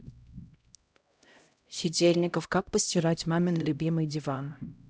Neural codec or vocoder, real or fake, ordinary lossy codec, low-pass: codec, 16 kHz, 0.5 kbps, X-Codec, HuBERT features, trained on LibriSpeech; fake; none; none